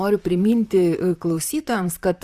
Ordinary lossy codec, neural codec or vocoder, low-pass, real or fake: Opus, 64 kbps; vocoder, 44.1 kHz, 128 mel bands, Pupu-Vocoder; 14.4 kHz; fake